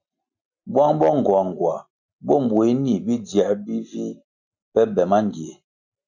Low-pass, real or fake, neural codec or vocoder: 7.2 kHz; real; none